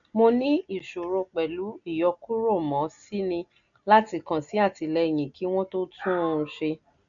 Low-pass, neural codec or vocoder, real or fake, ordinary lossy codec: 7.2 kHz; none; real; none